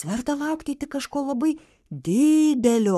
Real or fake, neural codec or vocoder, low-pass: fake; codec, 44.1 kHz, 3.4 kbps, Pupu-Codec; 14.4 kHz